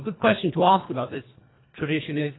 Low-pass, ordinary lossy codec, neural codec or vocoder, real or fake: 7.2 kHz; AAC, 16 kbps; codec, 24 kHz, 1.5 kbps, HILCodec; fake